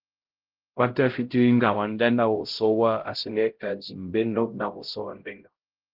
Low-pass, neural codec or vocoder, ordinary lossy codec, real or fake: 5.4 kHz; codec, 16 kHz, 0.5 kbps, X-Codec, HuBERT features, trained on LibriSpeech; Opus, 16 kbps; fake